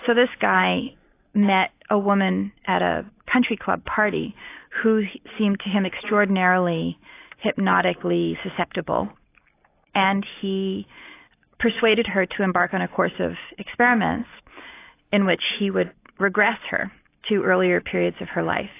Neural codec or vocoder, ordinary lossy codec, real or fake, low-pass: none; AAC, 24 kbps; real; 3.6 kHz